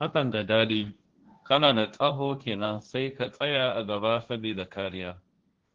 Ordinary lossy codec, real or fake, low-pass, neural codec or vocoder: Opus, 16 kbps; fake; 7.2 kHz; codec, 16 kHz, 1.1 kbps, Voila-Tokenizer